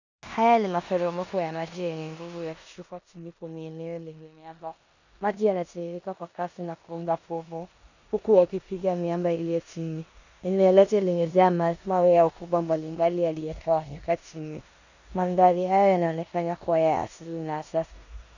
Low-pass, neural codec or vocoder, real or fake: 7.2 kHz; codec, 16 kHz in and 24 kHz out, 0.9 kbps, LongCat-Audio-Codec, four codebook decoder; fake